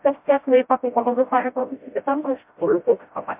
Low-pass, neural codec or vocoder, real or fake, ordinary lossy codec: 3.6 kHz; codec, 16 kHz, 0.5 kbps, FreqCodec, smaller model; fake; MP3, 32 kbps